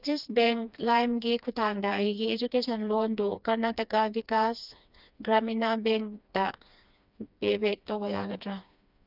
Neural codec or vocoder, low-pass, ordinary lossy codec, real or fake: codec, 16 kHz, 2 kbps, FreqCodec, smaller model; 5.4 kHz; none; fake